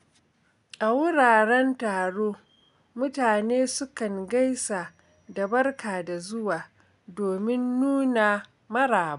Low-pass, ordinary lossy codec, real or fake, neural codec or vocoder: 10.8 kHz; none; real; none